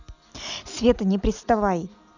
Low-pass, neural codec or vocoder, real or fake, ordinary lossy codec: 7.2 kHz; none; real; none